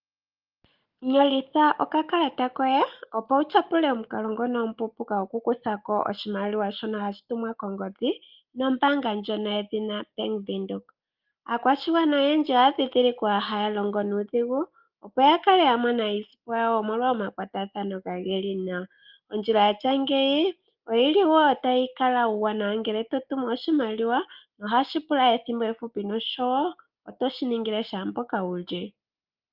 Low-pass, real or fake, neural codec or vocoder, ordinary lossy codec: 5.4 kHz; real; none; Opus, 24 kbps